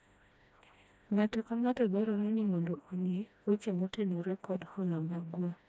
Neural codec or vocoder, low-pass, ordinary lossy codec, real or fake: codec, 16 kHz, 1 kbps, FreqCodec, smaller model; none; none; fake